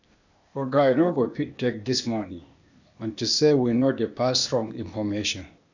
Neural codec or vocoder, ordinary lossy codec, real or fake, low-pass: codec, 16 kHz, 0.8 kbps, ZipCodec; none; fake; 7.2 kHz